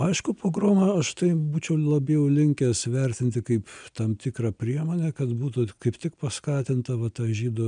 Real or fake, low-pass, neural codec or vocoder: real; 9.9 kHz; none